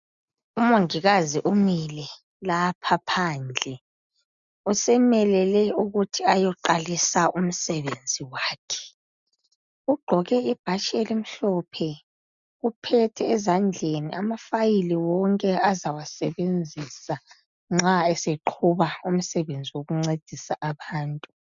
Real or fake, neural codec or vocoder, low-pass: real; none; 7.2 kHz